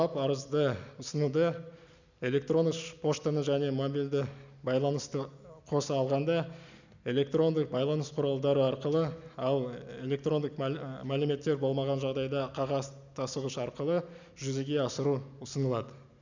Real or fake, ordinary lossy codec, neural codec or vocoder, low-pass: real; none; none; 7.2 kHz